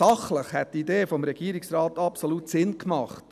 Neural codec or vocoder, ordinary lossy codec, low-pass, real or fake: none; none; 14.4 kHz; real